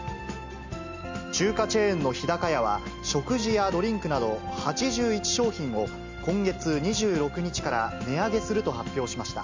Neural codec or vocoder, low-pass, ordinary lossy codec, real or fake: none; 7.2 kHz; MP3, 64 kbps; real